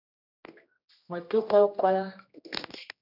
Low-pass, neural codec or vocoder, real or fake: 5.4 kHz; codec, 16 kHz, 1 kbps, X-Codec, HuBERT features, trained on general audio; fake